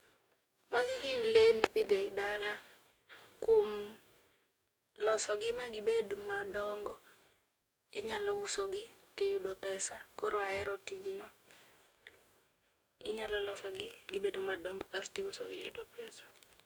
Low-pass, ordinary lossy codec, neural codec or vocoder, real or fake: none; none; codec, 44.1 kHz, 2.6 kbps, DAC; fake